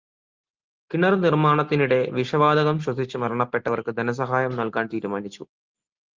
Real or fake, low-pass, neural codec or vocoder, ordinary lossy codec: real; 7.2 kHz; none; Opus, 32 kbps